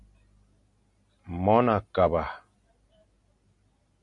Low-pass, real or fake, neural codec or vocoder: 10.8 kHz; real; none